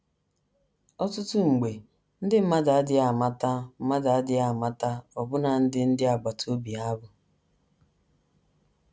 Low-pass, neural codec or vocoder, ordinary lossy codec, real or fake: none; none; none; real